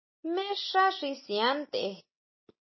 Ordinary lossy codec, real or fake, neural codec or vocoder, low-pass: MP3, 24 kbps; real; none; 7.2 kHz